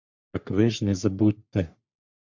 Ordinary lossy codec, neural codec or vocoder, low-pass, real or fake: MP3, 48 kbps; codec, 44.1 kHz, 3.4 kbps, Pupu-Codec; 7.2 kHz; fake